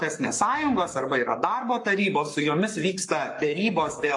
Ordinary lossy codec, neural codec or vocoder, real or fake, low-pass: AAC, 48 kbps; codec, 44.1 kHz, 7.8 kbps, Pupu-Codec; fake; 10.8 kHz